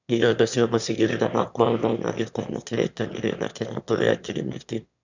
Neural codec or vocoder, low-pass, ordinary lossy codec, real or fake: autoencoder, 22.05 kHz, a latent of 192 numbers a frame, VITS, trained on one speaker; 7.2 kHz; none; fake